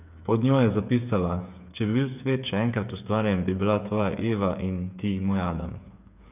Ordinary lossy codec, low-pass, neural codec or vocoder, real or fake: none; 3.6 kHz; codec, 16 kHz, 8 kbps, FreqCodec, smaller model; fake